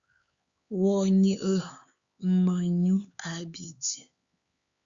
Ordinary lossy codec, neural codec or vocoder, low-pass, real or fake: Opus, 64 kbps; codec, 16 kHz, 4 kbps, X-Codec, HuBERT features, trained on LibriSpeech; 7.2 kHz; fake